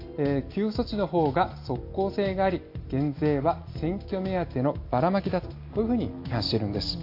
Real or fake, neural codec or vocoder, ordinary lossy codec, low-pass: real; none; AAC, 32 kbps; 5.4 kHz